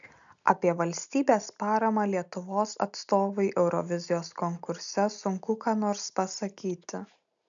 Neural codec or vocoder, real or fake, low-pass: none; real; 7.2 kHz